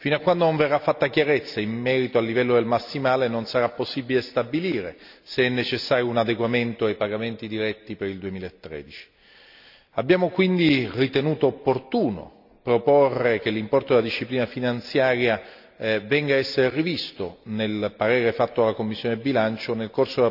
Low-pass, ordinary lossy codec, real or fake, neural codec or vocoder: 5.4 kHz; none; real; none